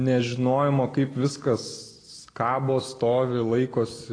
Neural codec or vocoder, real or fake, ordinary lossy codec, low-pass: none; real; AAC, 32 kbps; 9.9 kHz